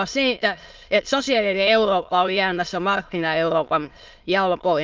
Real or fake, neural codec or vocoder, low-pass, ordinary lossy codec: fake; autoencoder, 22.05 kHz, a latent of 192 numbers a frame, VITS, trained on many speakers; 7.2 kHz; Opus, 24 kbps